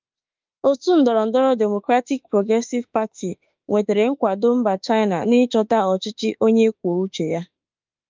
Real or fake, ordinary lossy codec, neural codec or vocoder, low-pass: fake; Opus, 24 kbps; autoencoder, 48 kHz, 32 numbers a frame, DAC-VAE, trained on Japanese speech; 7.2 kHz